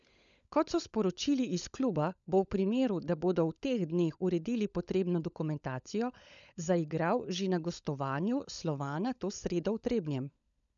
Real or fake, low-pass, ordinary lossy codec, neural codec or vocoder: fake; 7.2 kHz; none; codec, 16 kHz, 16 kbps, FunCodec, trained on LibriTTS, 50 frames a second